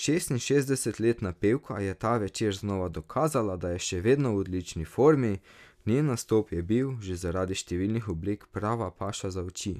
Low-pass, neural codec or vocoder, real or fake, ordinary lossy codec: 14.4 kHz; none; real; none